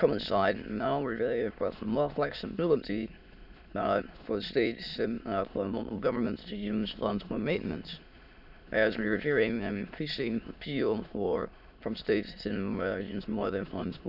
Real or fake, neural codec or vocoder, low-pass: fake; autoencoder, 22.05 kHz, a latent of 192 numbers a frame, VITS, trained on many speakers; 5.4 kHz